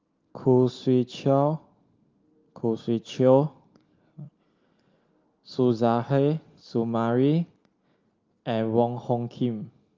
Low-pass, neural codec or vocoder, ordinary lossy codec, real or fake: 7.2 kHz; none; Opus, 32 kbps; real